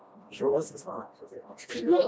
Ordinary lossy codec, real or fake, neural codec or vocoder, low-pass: none; fake; codec, 16 kHz, 1 kbps, FreqCodec, smaller model; none